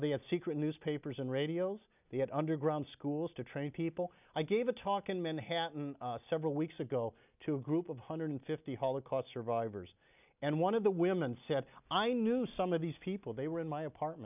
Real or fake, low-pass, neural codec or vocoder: real; 3.6 kHz; none